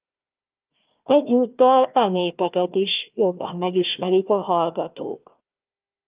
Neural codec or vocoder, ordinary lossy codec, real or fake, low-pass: codec, 16 kHz, 1 kbps, FunCodec, trained on Chinese and English, 50 frames a second; Opus, 24 kbps; fake; 3.6 kHz